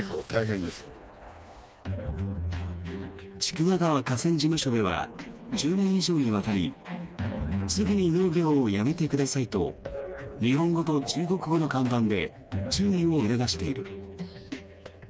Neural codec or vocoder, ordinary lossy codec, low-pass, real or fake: codec, 16 kHz, 2 kbps, FreqCodec, smaller model; none; none; fake